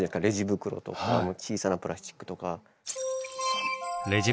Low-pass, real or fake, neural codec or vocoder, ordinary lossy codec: none; real; none; none